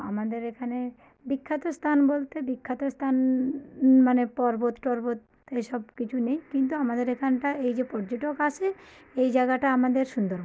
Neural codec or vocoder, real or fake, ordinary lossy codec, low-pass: none; real; none; none